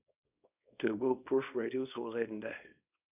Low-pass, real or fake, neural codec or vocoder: 3.6 kHz; fake; codec, 24 kHz, 0.9 kbps, WavTokenizer, small release